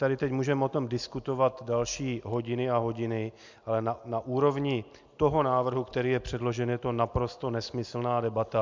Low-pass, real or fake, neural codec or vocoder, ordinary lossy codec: 7.2 kHz; real; none; MP3, 64 kbps